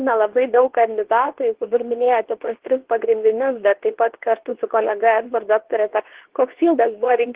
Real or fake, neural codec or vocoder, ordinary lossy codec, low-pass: fake; codec, 24 kHz, 0.9 kbps, WavTokenizer, medium speech release version 2; Opus, 16 kbps; 3.6 kHz